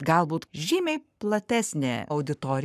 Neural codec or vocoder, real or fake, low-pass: codec, 44.1 kHz, 7.8 kbps, Pupu-Codec; fake; 14.4 kHz